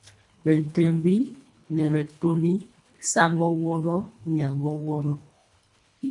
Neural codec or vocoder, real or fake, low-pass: codec, 24 kHz, 1.5 kbps, HILCodec; fake; 10.8 kHz